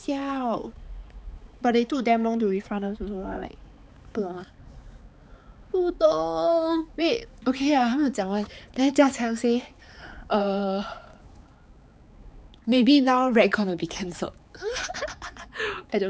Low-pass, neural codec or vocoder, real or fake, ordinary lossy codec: none; codec, 16 kHz, 4 kbps, X-Codec, HuBERT features, trained on balanced general audio; fake; none